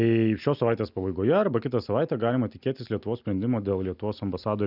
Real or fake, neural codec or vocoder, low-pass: real; none; 5.4 kHz